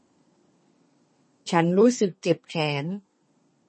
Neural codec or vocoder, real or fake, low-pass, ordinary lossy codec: codec, 32 kHz, 1.9 kbps, SNAC; fake; 10.8 kHz; MP3, 32 kbps